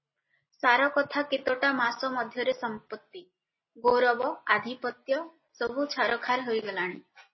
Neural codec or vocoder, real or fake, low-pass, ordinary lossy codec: none; real; 7.2 kHz; MP3, 24 kbps